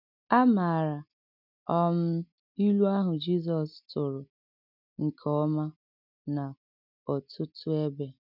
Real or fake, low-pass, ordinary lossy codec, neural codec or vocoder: real; 5.4 kHz; none; none